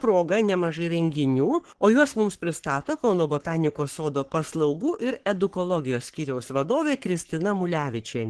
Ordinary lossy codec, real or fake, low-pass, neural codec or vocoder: Opus, 16 kbps; fake; 10.8 kHz; codec, 44.1 kHz, 3.4 kbps, Pupu-Codec